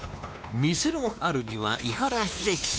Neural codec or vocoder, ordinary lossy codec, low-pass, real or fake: codec, 16 kHz, 2 kbps, X-Codec, WavLM features, trained on Multilingual LibriSpeech; none; none; fake